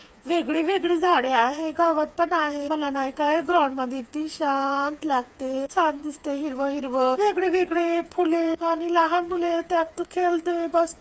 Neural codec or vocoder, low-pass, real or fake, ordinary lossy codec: codec, 16 kHz, 4 kbps, FreqCodec, smaller model; none; fake; none